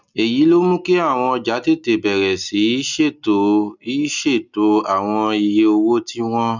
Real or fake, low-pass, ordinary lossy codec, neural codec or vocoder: real; 7.2 kHz; none; none